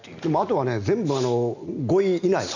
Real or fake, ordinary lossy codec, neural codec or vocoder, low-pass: real; none; none; 7.2 kHz